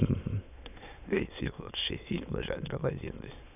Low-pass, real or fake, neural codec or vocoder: 3.6 kHz; fake; autoencoder, 22.05 kHz, a latent of 192 numbers a frame, VITS, trained on many speakers